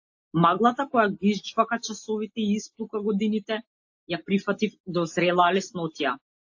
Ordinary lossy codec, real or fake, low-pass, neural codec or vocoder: AAC, 48 kbps; real; 7.2 kHz; none